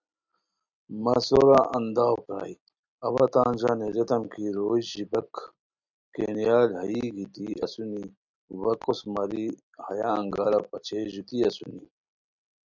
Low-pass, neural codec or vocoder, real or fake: 7.2 kHz; none; real